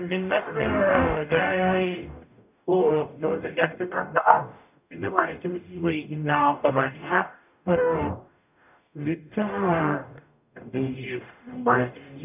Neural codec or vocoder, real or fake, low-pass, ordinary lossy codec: codec, 44.1 kHz, 0.9 kbps, DAC; fake; 3.6 kHz; none